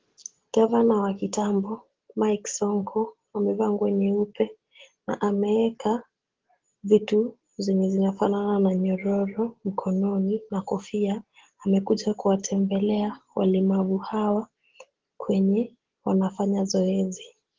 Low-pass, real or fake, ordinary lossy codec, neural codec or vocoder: 7.2 kHz; real; Opus, 16 kbps; none